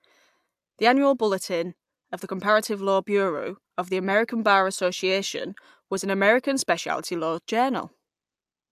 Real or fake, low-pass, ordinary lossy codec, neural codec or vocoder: real; 14.4 kHz; AAC, 96 kbps; none